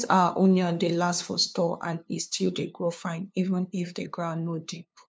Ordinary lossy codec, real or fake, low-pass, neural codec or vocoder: none; fake; none; codec, 16 kHz, 2 kbps, FunCodec, trained on LibriTTS, 25 frames a second